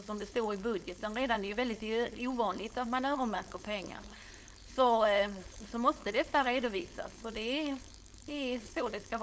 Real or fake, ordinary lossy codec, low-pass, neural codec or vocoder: fake; none; none; codec, 16 kHz, 4.8 kbps, FACodec